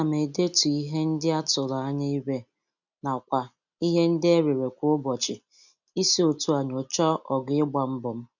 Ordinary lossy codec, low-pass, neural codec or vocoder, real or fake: none; 7.2 kHz; none; real